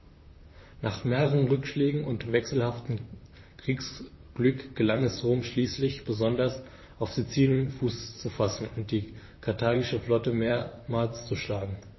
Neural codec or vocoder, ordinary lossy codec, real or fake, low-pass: vocoder, 44.1 kHz, 128 mel bands, Pupu-Vocoder; MP3, 24 kbps; fake; 7.2 kHz